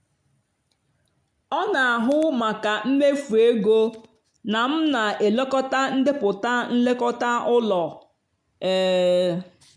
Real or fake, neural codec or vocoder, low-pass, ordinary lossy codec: real; none; 9.9 kHz; MP3, 64 kbps